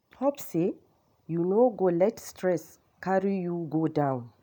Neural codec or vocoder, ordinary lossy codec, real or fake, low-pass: none; none; real; none